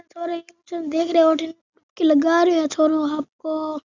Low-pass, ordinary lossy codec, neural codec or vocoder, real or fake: 7.2 kHz; none; none; real